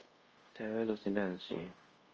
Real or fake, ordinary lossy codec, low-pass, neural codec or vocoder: fake; Opus, 24 kbps; 7.2 kHz; codec, 24 kHz, 0.5 kbps, DualCodec